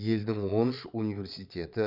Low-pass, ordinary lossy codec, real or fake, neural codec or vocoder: 5.4 kHz; none; fake; vocoder, 22.05 kHz, 80 mel bands, Vocos